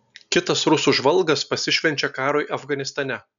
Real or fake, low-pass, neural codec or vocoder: real; 7.2 kHz; none